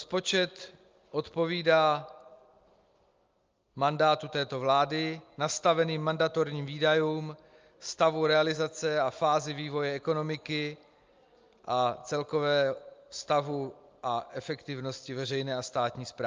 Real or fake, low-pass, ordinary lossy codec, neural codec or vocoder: real; 7.2 kHz; Opus, 24 kbps; none